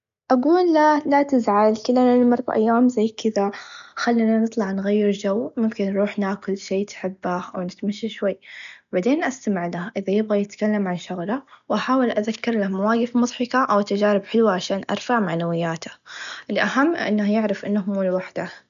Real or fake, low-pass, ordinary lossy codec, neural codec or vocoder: real; 7.2 kHz; none; none